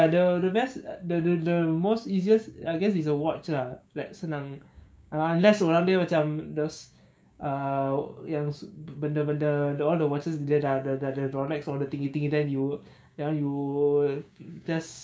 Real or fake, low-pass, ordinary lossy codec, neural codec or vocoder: fake; none; none; codec, 16 kHz, 6 kbps, DAC